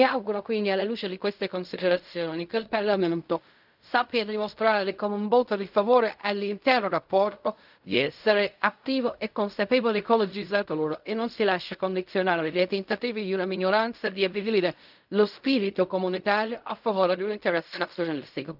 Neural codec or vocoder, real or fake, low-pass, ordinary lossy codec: codec, 16 kHz in and 24 kHz out, 0.4 kbps, LongCat-Audio-Codec, fine tuned four codebook decoder; fake; 5.4 kHz; none